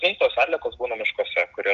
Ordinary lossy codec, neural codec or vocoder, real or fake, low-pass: Opus, 32 kbps; none; real; 7.2 kHz